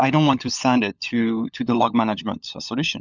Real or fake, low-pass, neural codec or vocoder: fake; 7.2 kHz; codec, 16 kHz, 16 kbps, FunCodec, trained on LibriTTS, 50 frames a second